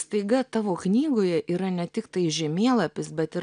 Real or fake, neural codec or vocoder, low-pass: real; none; 9.9 kHz